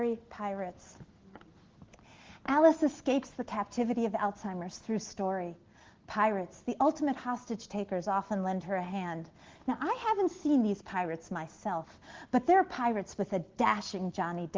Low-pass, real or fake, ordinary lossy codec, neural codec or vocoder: 7.2 kHz; real; Opus, 16 kbps; none